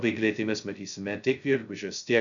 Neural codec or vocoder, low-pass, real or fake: codec, 16 kHz, 0.2 kbps, FocalCodec; 7.2 kHz; fake